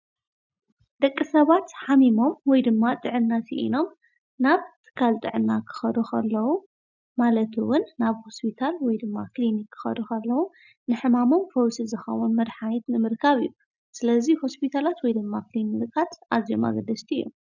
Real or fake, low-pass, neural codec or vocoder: real; 7.2 kHz; none